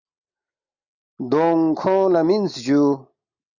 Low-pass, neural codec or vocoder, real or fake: 7.2 kHz; none; real